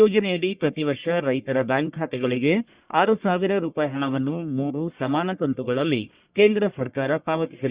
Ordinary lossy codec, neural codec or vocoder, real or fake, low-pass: Opus, 64 kbps; codec, 44.1 kHz, 1.7 kbps, Pupu-Codec; fake; 3.6 kHz